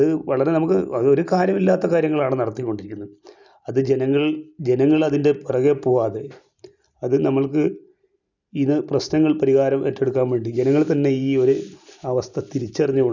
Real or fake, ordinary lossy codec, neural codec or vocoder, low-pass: real; none; none; 7.2 kHz